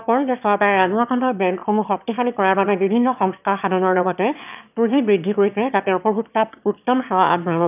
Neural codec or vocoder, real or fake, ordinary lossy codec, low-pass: autoencoder, 22.05 kHz, a latent of 192 numbers a frame, VITS, trained on one speaker; fake; none; 3.6 kHz